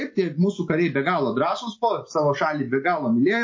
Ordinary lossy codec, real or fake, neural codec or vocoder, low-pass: MP3, 32 kbps; real; none; 7.2 kHz